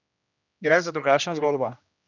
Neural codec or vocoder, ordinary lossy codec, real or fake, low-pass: codec, 16 kHz, 1 kbps, X-Codec, HuBERT features, trained on general audio; none; fake; 7.2 kHz